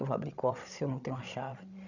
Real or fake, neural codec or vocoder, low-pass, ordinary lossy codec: fake; codec, 16 kHz, 16 kbps, FreqCodec, larger model; 7.2 kHz; none